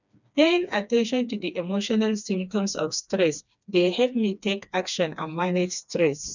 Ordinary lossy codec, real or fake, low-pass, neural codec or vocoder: none; fake; 7.2 kHz; codec, 16 kHz, 2 kbps, FreqCodec, smaller model